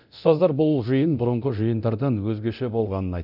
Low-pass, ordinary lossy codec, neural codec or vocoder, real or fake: 5.4 kHz; none; codec, 24 kHz, 0.9 kbps, DualCodec; fake